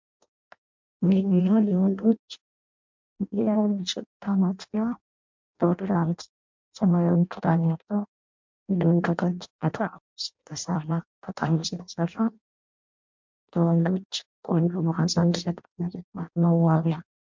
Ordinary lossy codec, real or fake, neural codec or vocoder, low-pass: MP3, 64 kbps; fake; codec, 16 kHz in and 24 kHz out, 0.6 kbps, FireRedTTS-2 codec; 7.2 kHz